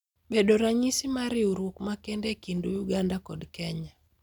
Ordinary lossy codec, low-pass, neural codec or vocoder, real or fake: none; 19.8 kHz; none; real